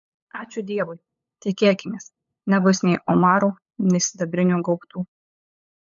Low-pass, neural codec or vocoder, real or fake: 7.2 kHz; codec, 16 kHz, 8 kbps, FunCodec, trained on LibriTTS, 25 frames a second; fake